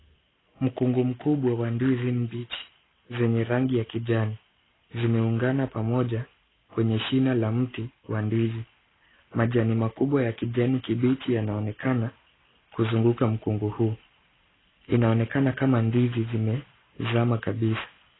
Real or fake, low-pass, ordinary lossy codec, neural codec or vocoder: real; 7.2 kHz; AAC, 16 kbps; none